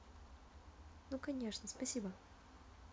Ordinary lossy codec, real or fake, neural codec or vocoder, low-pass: none; real; none; none